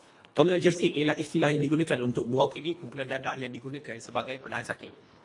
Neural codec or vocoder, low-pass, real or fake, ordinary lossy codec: codec, 24 kHz, 1.5 kbps, HILCodec; 10.8 kHz; fake; AAC, 48 kbps